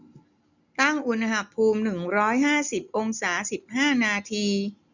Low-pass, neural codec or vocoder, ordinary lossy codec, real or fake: 7.2 kHz; none; none; real